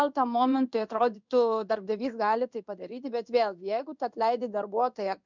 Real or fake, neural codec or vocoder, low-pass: fake; codec, 16 kHz in and 24 kHz out, 1 kbps, XY-Tokenizer; 7.2 kHz